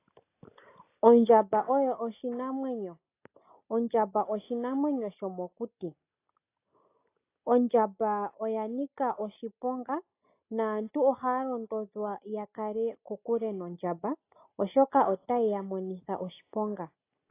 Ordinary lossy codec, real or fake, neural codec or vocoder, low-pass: AAC, 24 kbps; real; none; 3.6 kHz